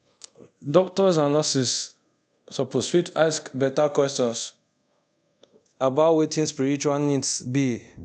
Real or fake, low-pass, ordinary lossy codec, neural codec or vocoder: fake; 9.9 kHz; none; codec, 24 kHz, 0.5 kbps, DualCodec